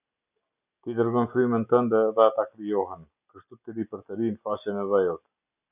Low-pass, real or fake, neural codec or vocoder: 3.6 kHz; real; none